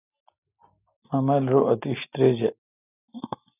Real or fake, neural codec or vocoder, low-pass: real; none; 3.6 kHz